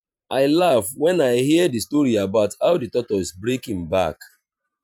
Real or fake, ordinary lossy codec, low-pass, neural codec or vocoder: fake; none; 19.8 kHz; vocoder, 44.1 kHz, 128 mel bands every 512 samples, BigVGAN v2